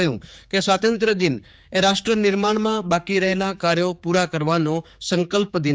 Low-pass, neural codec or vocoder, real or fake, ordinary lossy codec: none; codec, 16 kHz, 4 kbps, X-Codec, HuBERT features, trained on general audio; fake; none